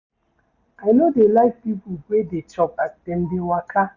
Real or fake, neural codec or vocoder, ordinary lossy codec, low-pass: real; none; Opus, 64 kbps; 7.2 kHz